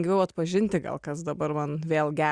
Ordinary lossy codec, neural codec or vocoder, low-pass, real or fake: Opus, 64 kbps; none; 9.9 kHz; real